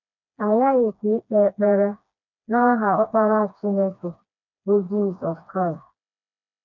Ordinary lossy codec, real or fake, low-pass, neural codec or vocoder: none; fake; 7.2 kHz; codec, 16 kHz, 2 kbps, FreqCodec, smaller model